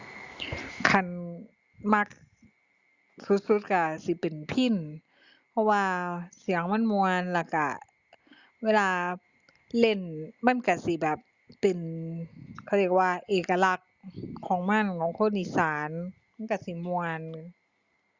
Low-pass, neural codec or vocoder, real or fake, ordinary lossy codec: 7.2 kHz; none; real; Opus, 64 kbps